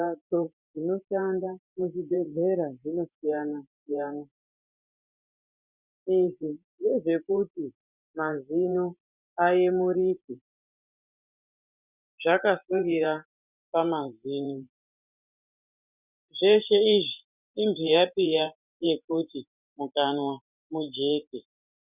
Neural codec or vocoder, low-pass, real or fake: vocoder, 44.1 kHz, 128 mel bands every 512 samples, BigVGAN v2; 3.6 kHz; fake